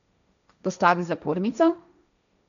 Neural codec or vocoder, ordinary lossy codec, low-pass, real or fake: codec, 16 kHz, 1.1 kbps, Voila-Tokenizer; none; 7.2 kHz; fake